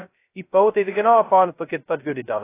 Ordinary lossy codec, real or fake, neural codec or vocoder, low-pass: AAC, 16 kbps; fake; codec, 16 kHz, 0.2 kbps, FocalCodec; 3.6 kHz